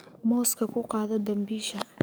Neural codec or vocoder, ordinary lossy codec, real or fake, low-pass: codec, 44.1 kHz, 7.8 kbps, DAC; none; fake; none